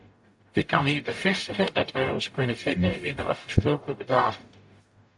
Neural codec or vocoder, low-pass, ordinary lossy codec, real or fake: codec, 44.1 kHz, 0.9 kbps, DAC; 10.8 kHz; AAC, 64 kbps; fake